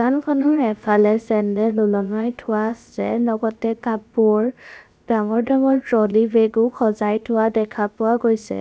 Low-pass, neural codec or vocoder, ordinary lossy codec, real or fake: none; codec, 16 kHz, about 1 kbps, DyCAST, with the encoder's durations; none; fake